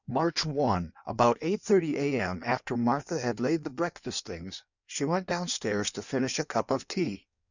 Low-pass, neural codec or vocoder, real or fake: 7.2 kHz; codec, 16 kHz in and 24 kHz out, 1.1 kbps, FireRedTTS-2 codec; fake